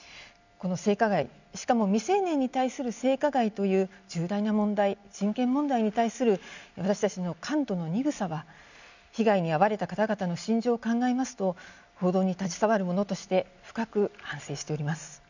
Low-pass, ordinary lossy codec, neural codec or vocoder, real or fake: 7.2 kHz; none; none; real